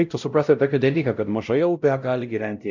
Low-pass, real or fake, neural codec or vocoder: 7.2 kHz; fake; codec, 16 kHz, 0.5 kbps, X-Codec, WavLM features, trained on Multilingual LibriSpeech